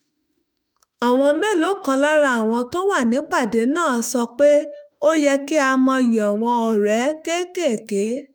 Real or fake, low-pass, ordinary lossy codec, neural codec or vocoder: fake; none; none; autoencoder, 48 kHz, 32 numbers a frame, DAC-VAE, trained on Japanese speech